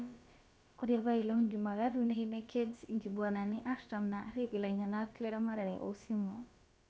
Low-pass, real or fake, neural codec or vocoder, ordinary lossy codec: none; fake; codec, 16 kHz, about 1 kbps, DyCAST, with the encoder's durations; none